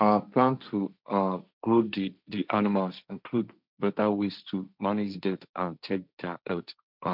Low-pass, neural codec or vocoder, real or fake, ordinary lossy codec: 5.4 kHz; codec, 16 kHz, 1.1 kbps, Voila-Tokenizer; fake; none